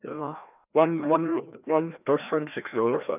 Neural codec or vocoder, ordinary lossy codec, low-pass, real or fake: codec, 16 kHz, 1 kbps, FreqCodec, larger model; none; 3.6 kHz; fake